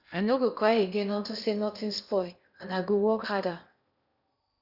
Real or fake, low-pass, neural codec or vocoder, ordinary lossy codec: fake; 5.4 kHz; codec, 16 kHz in and 24 kHz out, 0.8 kbps, FocalCodec, streaming, 65536 codes; none